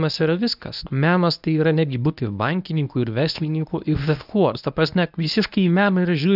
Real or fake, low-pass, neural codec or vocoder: fake; 5.4 kHz; codec, 24 kHz, 0.9 kbps, WavTokenizer, medium speech release version 1